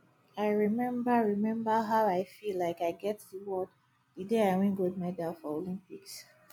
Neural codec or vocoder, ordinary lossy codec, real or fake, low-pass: none; MP3, 96 kbps; real; 19.8 kHz